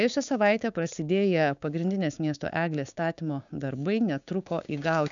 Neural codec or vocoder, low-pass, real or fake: codec, 16 kHz, 6 kbps, DAC; 7.2 kHz; fake